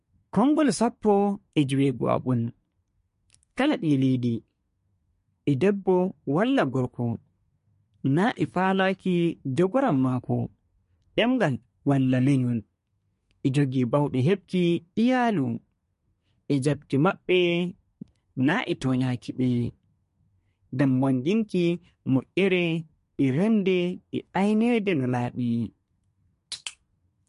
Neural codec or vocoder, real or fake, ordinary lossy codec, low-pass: codec, 24 kHz, 1 kbps, SNAC; fake; MP3, 48 kbps; 10.8 kHz